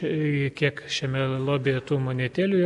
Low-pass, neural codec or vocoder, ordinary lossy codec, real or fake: 10.8 kHz; none; MP3, 96 kbps; real